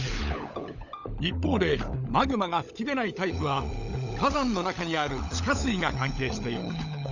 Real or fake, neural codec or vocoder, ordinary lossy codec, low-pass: fake; codec, 16 kHz, 16 kbps, FunCodec, trained on LibriTTS, 50 frames a second; none; 7.2 kHz